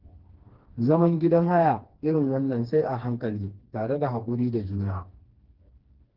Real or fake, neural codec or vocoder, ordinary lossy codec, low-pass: fake; codec, 16 kHz, 2 kbps, FreqCodec, smaller model; Opus, 16 kbps; 5.4 kHz